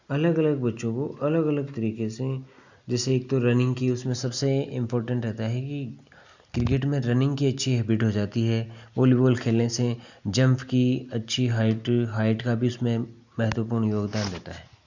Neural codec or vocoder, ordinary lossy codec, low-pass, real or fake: none; none; 7.2 kHz; real